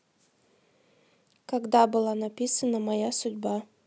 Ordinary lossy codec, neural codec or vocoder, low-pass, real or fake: none; none; none; real